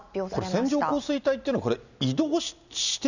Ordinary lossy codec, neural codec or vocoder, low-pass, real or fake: none; none; 7.2 kHz; real